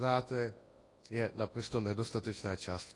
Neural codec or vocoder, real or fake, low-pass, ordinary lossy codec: codec, 24 kHz, 0.9 kbps, WavTokenizer, large speech release; fake; 10.8 kHz; AAC, 32 kbps